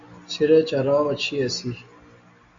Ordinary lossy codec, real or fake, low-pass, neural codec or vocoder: MP3, 48 kbps; real; 7.2 kHz; none